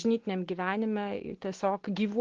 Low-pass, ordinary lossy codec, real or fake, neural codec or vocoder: 7.2 kHz; Opus, 16 kbps; real; none